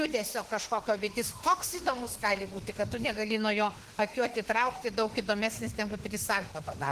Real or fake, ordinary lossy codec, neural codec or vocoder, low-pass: fake; Opus, 16 kbps; autoencoder, 48 kHz, 32 numbers a frame, DAC-VAE, trained on Japanese speech; 14.4 kHz